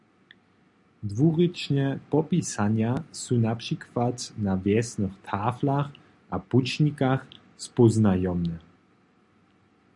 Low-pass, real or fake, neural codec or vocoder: 10.8 kHz; real; none